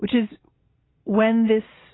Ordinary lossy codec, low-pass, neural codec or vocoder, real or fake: AAC, 16 kbps; 7.2 kHz; none; real